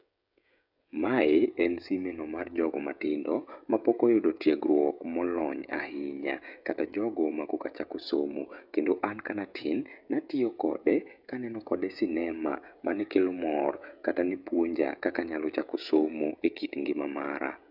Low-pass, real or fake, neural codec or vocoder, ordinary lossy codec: 5.4 kHz; fake; codec, 16 kHz, 16 kbps, FreqCodec, smaller model; none